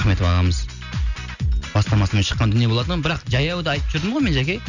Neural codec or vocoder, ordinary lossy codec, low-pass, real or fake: none; none; 7.2 kHz; real